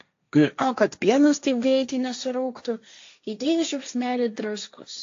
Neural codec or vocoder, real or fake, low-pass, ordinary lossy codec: codec, 16 kHz, 1.1 kbps, Voila-Tokenizer; fake; 7.2 kHz; AAC, 48 kbps